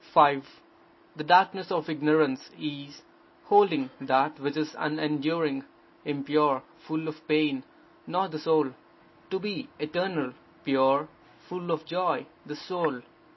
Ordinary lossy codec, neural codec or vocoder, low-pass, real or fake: MP3, 24 kbps; none; 7.2 kHz; real